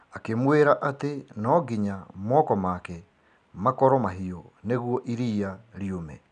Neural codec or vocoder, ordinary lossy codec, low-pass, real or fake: none; none; 9.9 kHz; real